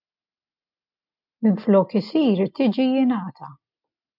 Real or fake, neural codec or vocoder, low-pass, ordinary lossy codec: real; none; 5.4 kHz; MP3, 48 kbps